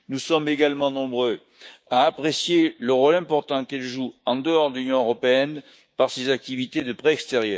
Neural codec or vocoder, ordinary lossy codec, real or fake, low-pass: codec, 16 kHz, 6 kbps, DAC; none; fake; none